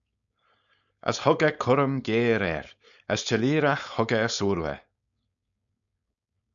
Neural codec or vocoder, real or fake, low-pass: codec, 16 kHz, 4.8 kbps, FACodec; fake; 7.2 kHz